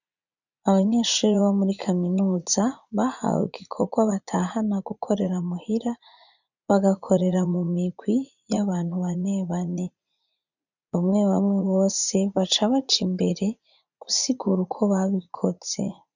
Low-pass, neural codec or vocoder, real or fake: 7.2 kHz; vocoder, 44.1 kHz, 80 mel bands, Vocos; fake